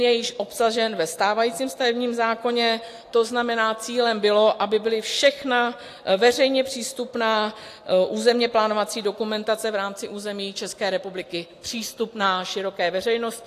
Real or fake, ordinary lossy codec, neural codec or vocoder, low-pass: real; AAC, 64 kbps; none; 14.4 kHz